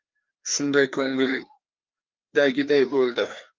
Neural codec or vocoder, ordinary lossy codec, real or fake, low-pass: codec, 16 kHz, 2 kbps, FreqCodec, larger model; Opus, 32 kbps; fake; 7.2 kHz